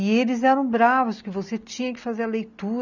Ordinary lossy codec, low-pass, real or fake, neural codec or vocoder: none; 7.2 kHz; real; none